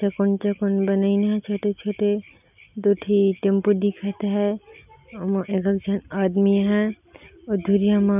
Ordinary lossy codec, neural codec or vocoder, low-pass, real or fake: none; none; 3.6 kHz; real